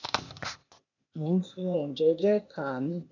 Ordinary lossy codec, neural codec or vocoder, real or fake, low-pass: none; codec, 16 kHz, 0.8 kbps, ZipCodec; fake; 7.2 kHz